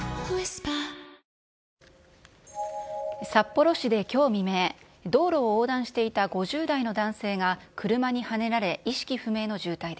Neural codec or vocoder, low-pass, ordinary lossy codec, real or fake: none; none; none; real